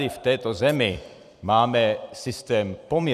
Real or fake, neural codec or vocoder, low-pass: fake; vocoder, 44.1 kHz, 128 mel bands every 256 samples, BigVGAN v2; 14.4 kHz